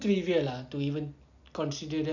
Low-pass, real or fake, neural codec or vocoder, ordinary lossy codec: 7.2 kHz; real; none; none